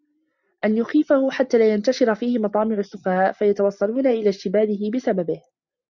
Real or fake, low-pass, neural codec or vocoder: real; 7.2 kHz; none